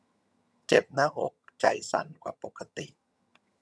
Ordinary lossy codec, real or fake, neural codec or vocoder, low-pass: none; fake; vocoder, 22.05 kHz, 80 mel bands, HiFi-GAN; none